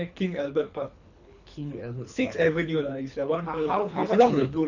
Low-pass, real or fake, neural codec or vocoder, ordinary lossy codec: 7.2 kHz; fake; codec, 24 kHz, 3 kbps, HILCodec; none